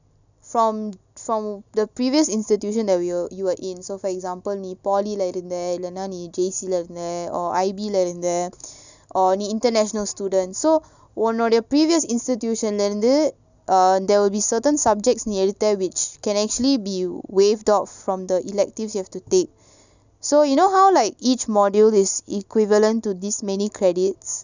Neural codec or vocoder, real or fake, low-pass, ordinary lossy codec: none; real; 7.2 kHz; none